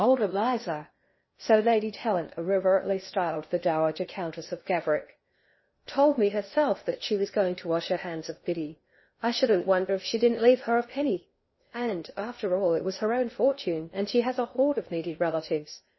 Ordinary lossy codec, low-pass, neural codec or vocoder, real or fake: MP3, 24 kbps; 7.2 kHz; codec, 16 kHz in and 24 kHz out, 0.6 kbps, FocalCodec, streaming, 4096 codes; fake